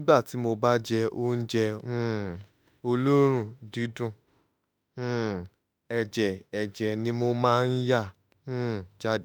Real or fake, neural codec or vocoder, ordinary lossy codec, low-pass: fake; autoencoder, 48 kHz, 32 numbers a frame, DAC-VAE, trained on Japanese speech; none; none